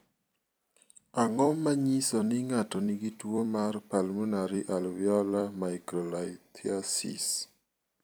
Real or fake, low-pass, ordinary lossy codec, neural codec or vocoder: fake; none; none; vocoder, 44.1 kHz, 128 mel bands every 256 samples, BigVGAN v2